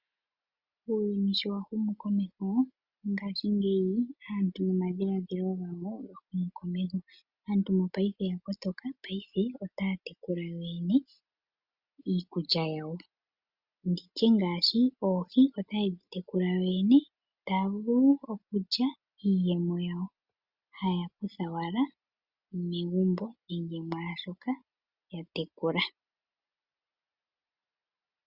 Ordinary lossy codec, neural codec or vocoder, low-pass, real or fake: Opus, 64 kbps; none; 5.4 kHz; real